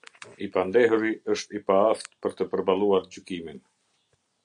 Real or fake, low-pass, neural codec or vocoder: real; 9.9 kHz; none